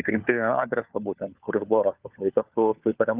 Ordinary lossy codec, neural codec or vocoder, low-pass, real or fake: Opus, 32 kbps; codec, 16 kHz, 4 kbps, FunCodec, trained on LibriTTS, 50 frames a second; 3.6 kHz; fake